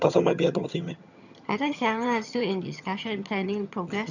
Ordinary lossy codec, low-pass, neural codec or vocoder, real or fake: none; 7.2 kHz; vocoder, 22.05 kHz, 80 mel bands, HiFi-GAN; fake